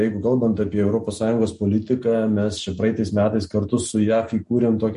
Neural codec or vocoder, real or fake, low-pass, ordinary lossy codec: none; real; 10.8 kHz; MP3, 48 kbps